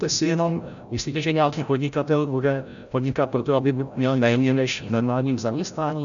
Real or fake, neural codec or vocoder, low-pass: fake; codec, 16 kHz, 0.5 kbps, FreqCodec, larger model; 7.2 kHz